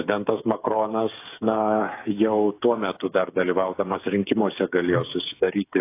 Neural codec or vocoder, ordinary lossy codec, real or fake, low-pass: codec, 16 kHz, 8 kbps, FreqCodec, smaller model; AAC, 24 kbps; fake; 3.6 kHz